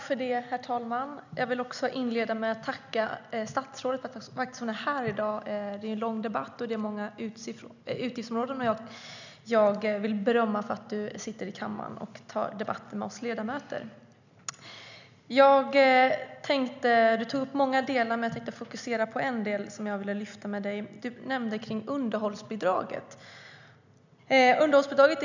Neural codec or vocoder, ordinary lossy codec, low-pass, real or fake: none; none; 7.2 kHz; real